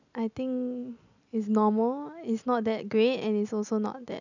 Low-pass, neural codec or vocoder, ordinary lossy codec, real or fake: 7.2 kHz; none; none; real